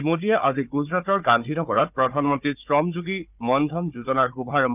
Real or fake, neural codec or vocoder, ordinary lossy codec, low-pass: fake; codec, 16 kHz, 4 kbps, FunCodec, trained on Chinese and English, 50 frames a second; none; 3.6 kHz